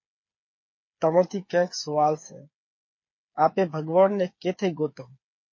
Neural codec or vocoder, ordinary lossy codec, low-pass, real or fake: codec, 16 kHz, 8 kbps, FreqCodec, smaller model; MP3, 32 kbps; 7.2 kHz; fake